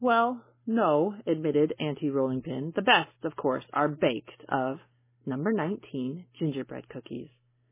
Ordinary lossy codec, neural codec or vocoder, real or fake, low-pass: MP3, 16 kbps; none; real; 3.6 kHz